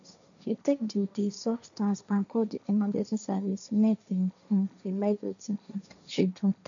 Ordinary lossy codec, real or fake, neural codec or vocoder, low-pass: none; fake; codec, 16 kHz, 1.1 kbps, Voila-Tokenizer; 7.2 kHz